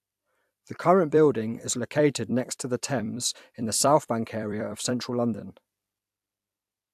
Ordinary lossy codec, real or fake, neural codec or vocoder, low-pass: AAC, 96 kbps; fake; vocoder, 44.1 kHz, 128 mel bands every 256 samples, BigVGAN v2; 14.4 kHz